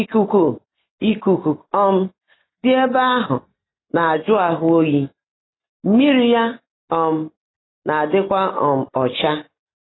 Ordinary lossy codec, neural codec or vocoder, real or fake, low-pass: AAC, 16 kbps; none; real; 7.2 kHz